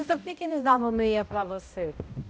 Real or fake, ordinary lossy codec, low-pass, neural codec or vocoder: fake; none; none; codec, 16 kHz, 0.5 kbps, X-Codec, HuBERT features, trained on balanced general audio